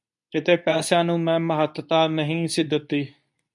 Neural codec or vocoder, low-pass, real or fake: codec, 24 kHz, 0.9 kbps, WavTokenizer, medium speech release version 2; 10.8 kHz; fake